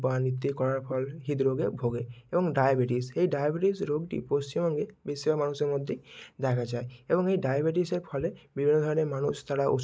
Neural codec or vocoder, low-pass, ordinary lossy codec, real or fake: none; none; none; real